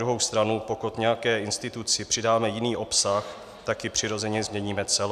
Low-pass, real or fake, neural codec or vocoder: 14.4 kHz; real; none